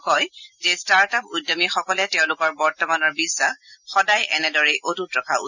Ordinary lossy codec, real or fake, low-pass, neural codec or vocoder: none; real; 7.2 kHz; none